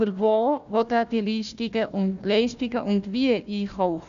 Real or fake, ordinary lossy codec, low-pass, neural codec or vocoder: fake; none; 7.2 kHz; codec, 16 kHz, 1 kbps, FunCodec, trained on Chinese and English, 50 frames a second